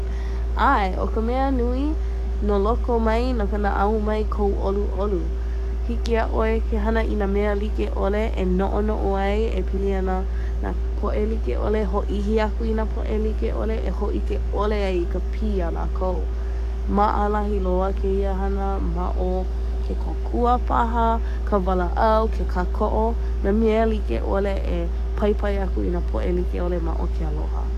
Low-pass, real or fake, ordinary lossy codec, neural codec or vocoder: 14.4 kHz; fake; none; codec, 44.1 kHz, 7.8 kbps, DAC